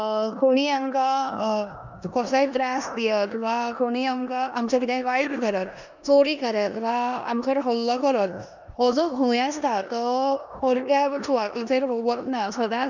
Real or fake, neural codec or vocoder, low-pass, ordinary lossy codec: fake; codec, 16 kHz in and 24 kHz out, 0.9 kbps, LongCat-Audio-Codec, four codebook decoder; 7.2 kHz; none